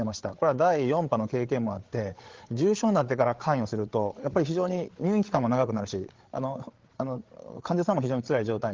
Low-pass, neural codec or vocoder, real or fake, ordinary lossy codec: 7.2 kHz; codec, 16 kHz, 8 kbps, FreqCodec, larger model; fake; Opus, 16 kbps